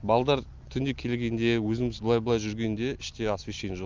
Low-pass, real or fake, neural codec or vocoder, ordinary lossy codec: 7.2 kHz; real; none; Opus, 24 kbps